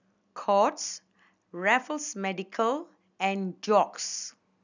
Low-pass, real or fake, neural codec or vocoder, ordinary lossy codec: 7.2 kHz; real; none; none